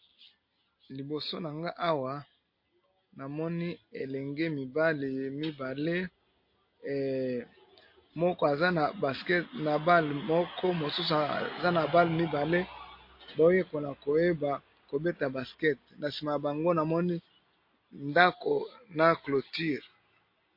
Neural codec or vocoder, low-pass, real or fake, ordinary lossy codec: none; 5.4 kHz; real; MP3, 32 kbps